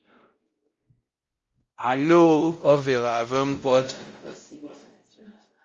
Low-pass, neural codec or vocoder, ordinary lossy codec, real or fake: 7.2 kHz; codec, 16 kHz, 0.5 kbps, X-Codec, WavLM features, trained on Multilingual LibriSpeech; Opus, 32 kbps; fake